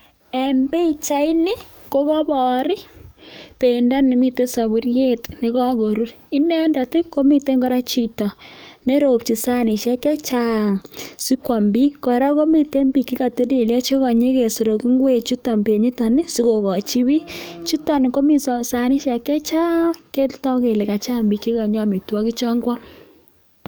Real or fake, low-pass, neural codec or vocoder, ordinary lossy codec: fake; none; codec, 44.1 kHz, 7.8 kbps, DAC; none